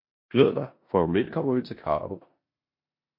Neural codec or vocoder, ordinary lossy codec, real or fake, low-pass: codec, 16 kHz in and 24 kHz out, 0.9 kbps, LongCat-Audio-Codec, four codebook decoder; MP3, 32 kbps; fake; 5.4 kHz